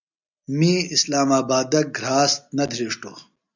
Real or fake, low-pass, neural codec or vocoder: real; 7.2 kHz; none